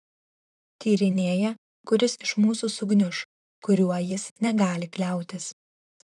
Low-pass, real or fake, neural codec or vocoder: 10.8 kHz; fake; vocoder, 44.1 kHz, 128 mel bands, Pupu-Vocoder